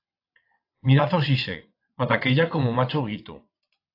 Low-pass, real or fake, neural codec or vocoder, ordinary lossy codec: 5.4 kHz; fake; vocoder, 22.05 kHz, 80 mel bands, WaveNeXt; AAC, 32 kbps